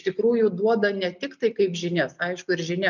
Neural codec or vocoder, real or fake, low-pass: none; real; 7.2 kHz